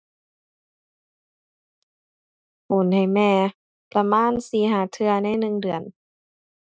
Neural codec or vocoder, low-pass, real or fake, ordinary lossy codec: none; none; real; none